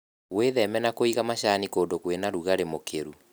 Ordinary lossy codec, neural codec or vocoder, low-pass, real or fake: none; none; none; real